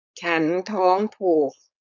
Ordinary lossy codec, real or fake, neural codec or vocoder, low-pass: none; fake; codec, 16 kHz, 4.8 kbps, FACodec; 7.2 kHz